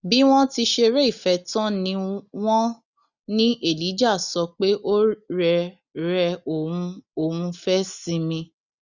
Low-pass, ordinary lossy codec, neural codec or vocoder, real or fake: 7.2 kHz; none; none; real